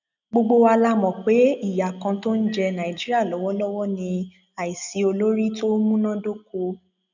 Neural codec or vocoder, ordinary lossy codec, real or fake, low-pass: none; none; real; 7.2 kHz